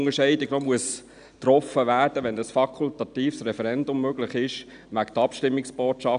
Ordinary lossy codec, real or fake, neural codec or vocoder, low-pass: none; real; none; 9.9 kHz